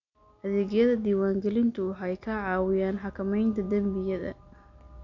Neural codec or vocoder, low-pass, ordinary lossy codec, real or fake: none; 7.2 kHz; none; real